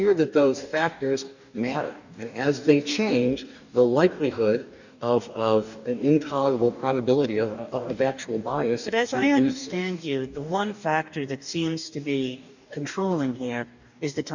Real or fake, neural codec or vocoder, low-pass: fake; codec, 44.1 kHz, 2.6 kbps, DAC; 7.2 kHz